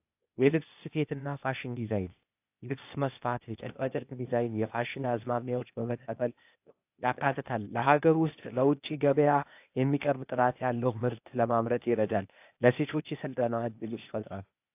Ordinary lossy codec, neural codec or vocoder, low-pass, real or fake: AAC, 32 kbps; codec, 16 kHz, 0.8 kbps, ZipCodec; 3.6 kHz; fake